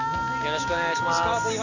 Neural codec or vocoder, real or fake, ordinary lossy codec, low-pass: none; real; none; 7.2 kHz